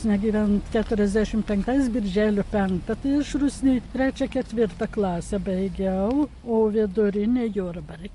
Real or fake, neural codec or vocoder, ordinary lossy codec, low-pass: real; none; MP3, 48 kbps; 14.4 kHz